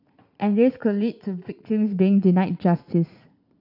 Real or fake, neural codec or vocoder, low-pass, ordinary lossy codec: fake; vocoder, 44.1 kHz, 80 mel bands, Vocos; 5.4 kHz; none